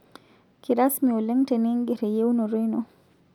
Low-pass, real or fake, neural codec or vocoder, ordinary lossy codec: 19.8 kHz; real; none; none